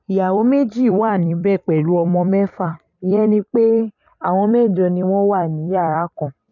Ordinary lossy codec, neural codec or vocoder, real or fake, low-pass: none; vocoder, 44.1 kHz, 128 mel bands every 256 samples, BigVGAN v2; fake; 7.2 kHz